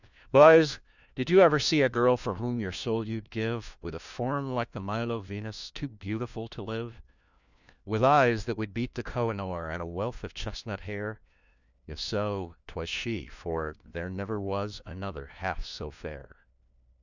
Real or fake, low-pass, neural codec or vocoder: fake; 7.2 kHz; codec, 16 kHz, 1 kbps, FunCodec, trained on LibriTTS, 50 frames a second